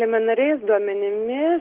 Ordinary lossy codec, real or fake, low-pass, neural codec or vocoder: Opus, 16 kbps; real; 3.6 kHz; none